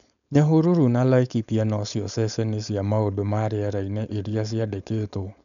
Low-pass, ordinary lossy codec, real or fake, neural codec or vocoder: 7.2 kHz; none; fake; codec, 16 kHz, 4.8 kbps, FACodec